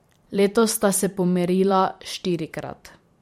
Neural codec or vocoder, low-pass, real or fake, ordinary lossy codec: vocoder, 44.1 kHz, 128 mel bands every 512 samples, BigVGAN v2; 19.8 kHz; fake; MP3, 64 kbps